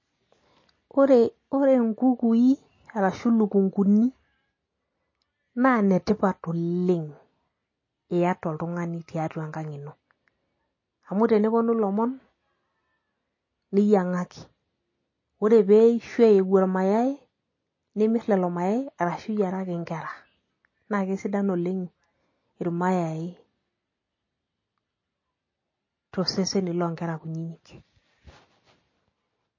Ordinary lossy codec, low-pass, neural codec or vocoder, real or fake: MP3, 32 kbps; 7.2 kHz; none; real